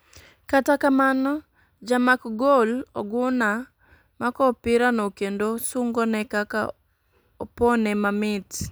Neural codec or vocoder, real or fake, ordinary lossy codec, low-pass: none; real; none; none